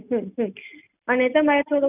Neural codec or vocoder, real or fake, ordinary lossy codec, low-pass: none; real; none; 3.6 kHz